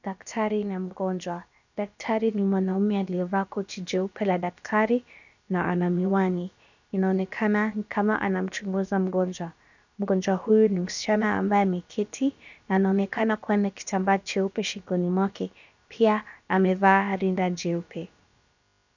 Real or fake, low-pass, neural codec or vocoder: fake; 7.2 kHz; codec, 16 kHz, about 1 kbps, DyCAST, with the encoder's durations